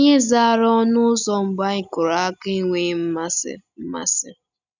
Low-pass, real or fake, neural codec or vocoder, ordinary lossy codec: 7.2 kHz; real; none; none